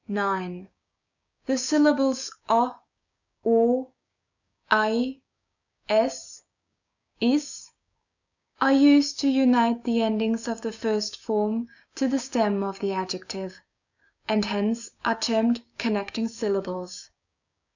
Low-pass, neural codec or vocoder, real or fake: 7.2 kHz; codec, 16 kHz, 6 kbps, DAC; fake